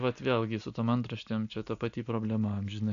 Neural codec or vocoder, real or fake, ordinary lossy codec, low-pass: none; real; MP3, 96 kbps; 7.2 kHz